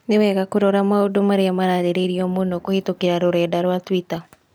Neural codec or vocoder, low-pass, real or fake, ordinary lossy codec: none; none; real; none